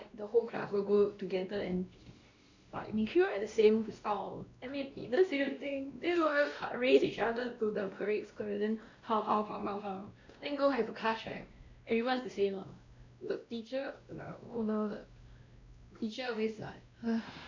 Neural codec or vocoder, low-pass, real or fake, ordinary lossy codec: codec, 16 kHz, 1 kbps, X-Codec, WavLM features, trained on Multilingual LibriSpeech; 7.2 kHz; fake; MP3, 64 kbps